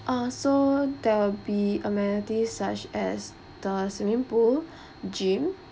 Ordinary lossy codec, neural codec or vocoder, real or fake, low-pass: none; none; real; none